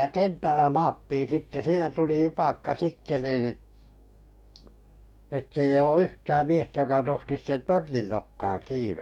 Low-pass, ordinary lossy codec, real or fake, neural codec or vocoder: 19.8 kHz; none; fake; codec, 44.1 kHz, 2.6 kbps, DAC